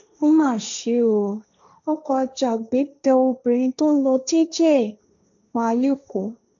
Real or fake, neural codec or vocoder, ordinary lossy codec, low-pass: fake; codec, 16 kHz, 1.1 kbps, Voila-Tokenizer; none; 7.2 kHz